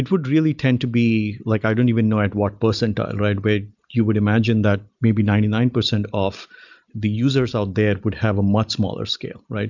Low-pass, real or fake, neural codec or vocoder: 7.2 kHz; real; none